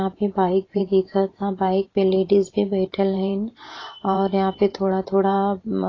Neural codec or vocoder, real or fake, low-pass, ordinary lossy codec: vocoder, 44.1 kHz, 128 mel bands every 512 samples, BigVGAN v2; fake; 7.2 kHz; AAC, 32 kbps